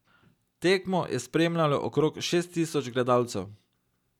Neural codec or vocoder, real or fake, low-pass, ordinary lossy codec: none; real; 19.8 kHz; none